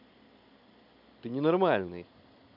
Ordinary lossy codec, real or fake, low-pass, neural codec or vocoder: none; real; 5.4 kHz; none